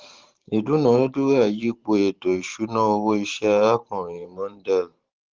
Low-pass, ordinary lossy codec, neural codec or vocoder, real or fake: 7.2 kHz; Opus, 16 kbps; vocoder, 44.1 kHz, 128 mel bands every 512 samples, BigVGAN v2; fake